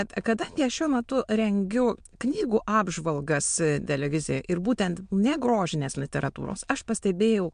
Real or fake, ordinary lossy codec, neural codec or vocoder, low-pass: fake; MP3, 64 kbps; autoencoder, 22.05 kHz, a latent of 192 numbers a frame, VITS, trained on many speakers; 9.9 kHz